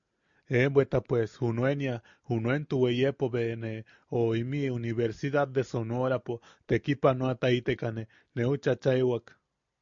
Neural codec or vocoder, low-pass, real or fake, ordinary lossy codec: none; 7.2 kHz; real; MP3, 64 kbps